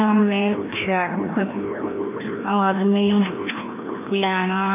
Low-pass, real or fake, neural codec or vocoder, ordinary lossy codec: 3.6 kHz; fake; codec, 16 kHz, 1 kbps, FreqCodec, larger model; MP3, 24 kbps